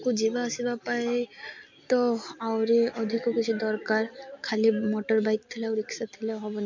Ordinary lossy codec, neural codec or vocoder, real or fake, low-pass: MP3, 48 kbps; none; real; 7.2 kHz